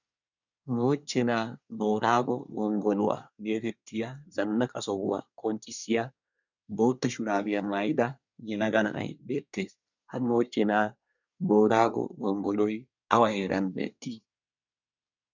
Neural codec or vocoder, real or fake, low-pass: codec, 24 kHz, 1 kbps, SNAC; fake; 7.2 kHz